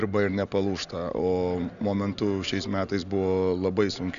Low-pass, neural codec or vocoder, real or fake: 7.2 kHz; none; real